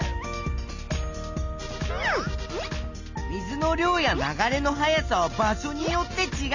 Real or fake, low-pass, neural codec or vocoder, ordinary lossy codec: real; 7.2 kHz; none; none